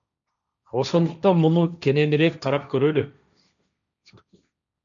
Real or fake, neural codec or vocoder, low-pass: fake; codec, 16 kHz, 1.1 kbps, Voila-Tokenizer; 7.2 kHz